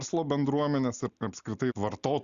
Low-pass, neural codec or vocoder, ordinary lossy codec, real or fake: 7.2 kHz; none; Opus, 64 kbps; real